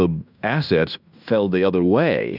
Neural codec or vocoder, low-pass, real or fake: codec, 16 kHz in and 24 kHz out, 0.9 kbps, LongCat-Audio-Codec, fine tuned four codebook decoder; 5.4 kHz; fake